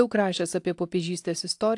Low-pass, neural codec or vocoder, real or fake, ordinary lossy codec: 10.8 kHz; none; real; AAC, 64 kbps